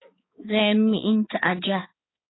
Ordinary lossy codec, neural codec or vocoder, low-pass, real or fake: AAC, 16 kbps; codec, 16 kHz, 4 kbps, X-Codec, HuBERT features, trained on balanced general audio; 7.2 kHz; fake